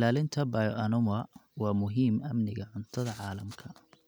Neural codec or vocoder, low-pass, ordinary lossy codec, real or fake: none; none; none; real